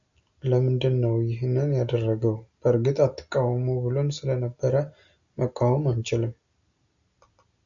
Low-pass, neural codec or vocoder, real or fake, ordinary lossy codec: 7.2 kHz; none; real; MP3, 64 kbps